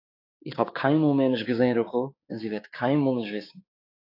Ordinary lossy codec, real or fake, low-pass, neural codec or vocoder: AAC, 32 kbps; fake; 5.4 kHz; codec, 16 kHz, 6 kbps, DAC